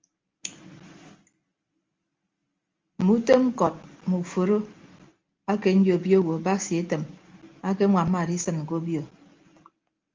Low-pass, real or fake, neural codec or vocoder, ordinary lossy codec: 7.2 kHz; real; none; Opus, 32 kbps